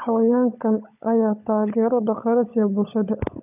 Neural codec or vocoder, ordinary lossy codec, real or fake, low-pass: codec, 16 kHz, 16 kbps, FunCodec, trained on LibriTTS, 50 frames a second; none; fake; 3.6 kHz